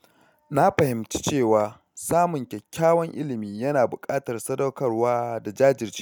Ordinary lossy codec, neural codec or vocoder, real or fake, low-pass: none; none; real; none